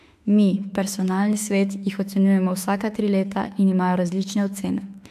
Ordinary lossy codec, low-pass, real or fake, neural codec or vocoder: AAC, 96 kbps; 14.4 kHz; fake; autoencoder, 48 kHz, 32 numbers a frame, DAC-VAE, trained on Japanese speech